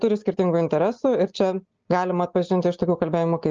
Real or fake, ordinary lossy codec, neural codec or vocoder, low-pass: real; Opus, 24 kbps; none; 7.2 kHz